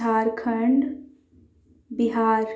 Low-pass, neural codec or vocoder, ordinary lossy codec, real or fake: none; none; none; real